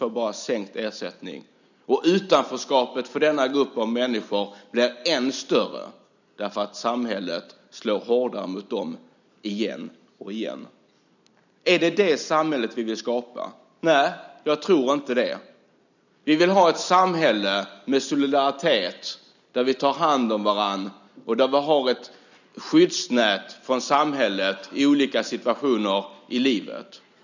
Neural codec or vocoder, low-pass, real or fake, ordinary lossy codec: none; 7.2 kHz; real; none